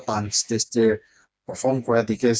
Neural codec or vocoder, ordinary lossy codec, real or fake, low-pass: codec, 16 kHz, 2 kbps, FreqCodec, smaller model; none; fake; none